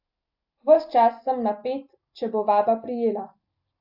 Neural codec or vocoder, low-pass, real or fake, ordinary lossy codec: none; 5.4 kHz; real; AAC, 48 kbps